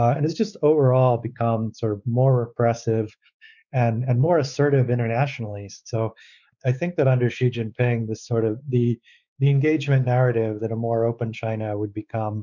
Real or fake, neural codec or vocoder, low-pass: fake; codec, 16 kHz, 4 kbps, X-Codec, WavLM features, trained on Multilingual LibriSpeech; 7.2 kHz